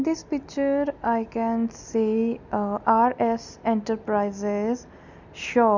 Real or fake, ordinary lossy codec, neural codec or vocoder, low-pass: real; none; none; 7.2 kHz